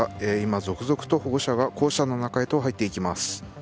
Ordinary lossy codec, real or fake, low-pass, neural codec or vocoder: none; real; none; none